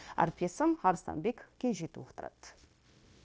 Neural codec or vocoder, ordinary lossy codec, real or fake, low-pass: codec, 16 kHz, 0.9 kbps, LongCat-Audio-Codec; none; fake; none